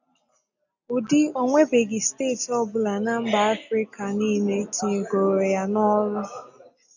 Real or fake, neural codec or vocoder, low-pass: real; none; 7.2 kHz